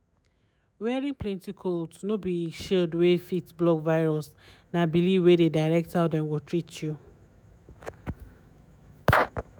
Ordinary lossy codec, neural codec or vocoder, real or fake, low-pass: none; autoencoder, 48 kHz, 128 numbers a frame, DAC-VAE, trained on Japanese speech; fake; none